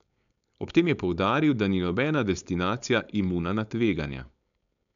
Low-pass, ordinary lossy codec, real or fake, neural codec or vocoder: 7.2 kHz; none; fake; codec, 16 kHz, 4.8 kbps, FACodec